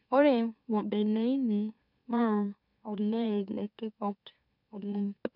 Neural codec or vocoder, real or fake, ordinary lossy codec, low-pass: autoencoder, 44.1 kHz, a latent of 192 numbers a frame, MeloTTS; fake; none; 5.4 kHz